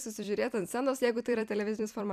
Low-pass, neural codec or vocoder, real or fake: 14.4 kHz; vocoder, 44.1 kHz, 128 mel bands every 256 samples, BigVGAN v2; fake